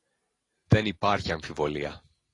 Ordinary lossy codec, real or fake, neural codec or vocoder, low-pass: AAC, 32 kbps; real; none; 10.8 kHz